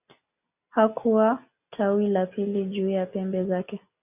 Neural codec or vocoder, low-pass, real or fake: none; 3.6 kHz; real